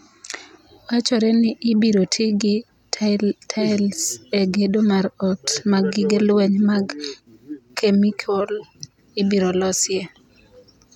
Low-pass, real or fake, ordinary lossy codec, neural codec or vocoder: 19.8 kHz; real; none; none